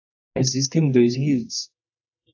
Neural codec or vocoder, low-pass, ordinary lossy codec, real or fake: codec, 24 kHz, 0.9 kbps, WavTokenizer, medium music audio release; 7.2 kHz; AAC, 48 kbps; fake